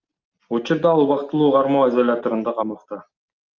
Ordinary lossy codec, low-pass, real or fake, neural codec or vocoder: Opus, 24 kbps; 7.2 kHz; real; none